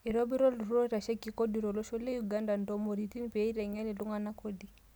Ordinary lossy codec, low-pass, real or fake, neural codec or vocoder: none; none; real; none